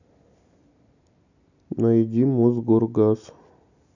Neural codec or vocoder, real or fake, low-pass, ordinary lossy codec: none; real; 7.2 kHz; none